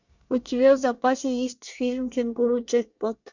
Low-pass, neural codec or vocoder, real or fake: 7.2 kHz; codec, 24 kHz, 1 kbps, SNAC; fake